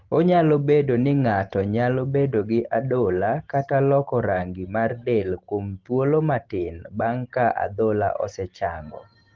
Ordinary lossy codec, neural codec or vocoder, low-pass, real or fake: Opus, 16 kbps; none; 7.2 kHz; real